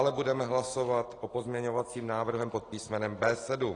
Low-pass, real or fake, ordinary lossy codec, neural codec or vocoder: 9.9 kHz; real; AAC, 32 kbps; none